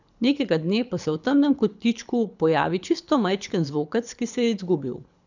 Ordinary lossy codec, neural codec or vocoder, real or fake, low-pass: none; codec, 24 kHz, 6 kbps, HILCodec; fake; 7.2 kHz